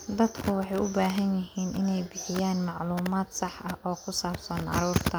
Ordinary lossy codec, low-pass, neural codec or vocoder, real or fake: none; none; none; real